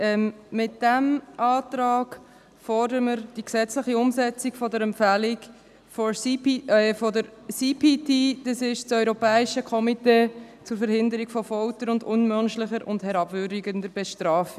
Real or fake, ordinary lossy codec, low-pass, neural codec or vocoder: real; none; 14.4 kHz; none